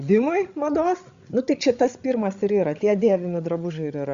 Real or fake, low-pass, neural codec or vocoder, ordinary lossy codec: fake; 7.2 kHz; codec, 16 kHz, 16 kbps, FreqCodec, larger model; Opus, 64 kbps